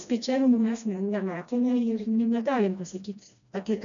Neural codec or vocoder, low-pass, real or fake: codec, 16 kHz, 1 kbps, FreqCodec, smaller model; 7.2 kHz; fake